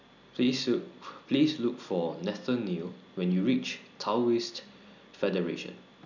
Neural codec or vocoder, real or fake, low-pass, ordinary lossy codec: none; real; 7.2 kHz; none